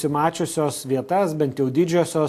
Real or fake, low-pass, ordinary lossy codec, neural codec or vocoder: real; 14.4 kHz; AAC, 64 kbps; none